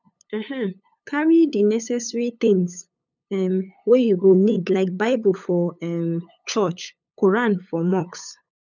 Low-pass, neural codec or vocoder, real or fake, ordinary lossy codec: 7.2 kHz; codec, 16 kHz, 8 kbps, FunCodec, trained on LibriTTS, 25 frames a second; fake; none